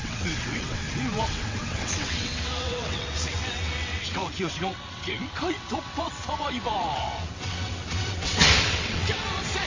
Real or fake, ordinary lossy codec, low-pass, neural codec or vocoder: fake; MP3, 32 kbps; 7.2 kHz; vocoder, 22.05 kHz, 80 mel bands, WaveNeXt